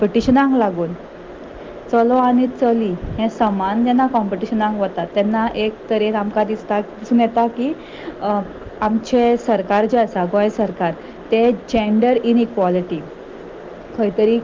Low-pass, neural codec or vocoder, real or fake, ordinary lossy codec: 7.2 kHz; none; real; Opus, 32 kbps